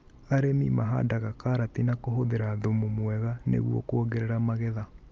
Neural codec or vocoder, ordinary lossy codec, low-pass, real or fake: none; Opus, 24 kbps; 7.2 kHz; real